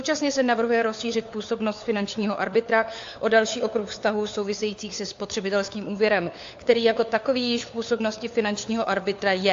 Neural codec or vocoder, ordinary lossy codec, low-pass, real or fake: codec, 16 kHz, 4 kbps, FunCodec, trained on LibriTTS, 50 frames a second; AAC, 48 kbps; 7.2 kHz; fake